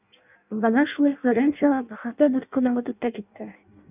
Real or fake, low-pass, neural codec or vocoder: fake; 3.6 kHz; codec, 16 kHz in and 24 kHz out, 0.6 kbps, FireRedTTS-2 codec